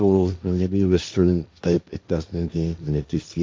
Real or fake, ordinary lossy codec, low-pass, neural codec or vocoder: fake; none; none; codec, 16 kHz, 1.1 kbps, Voila-Tokenizer